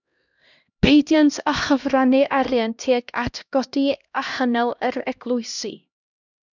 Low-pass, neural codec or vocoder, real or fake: 7.2 kHz; codec, 16 kHz, 1 kbps, X-Codec, HuBERT features, trained on LibriSpeech; fake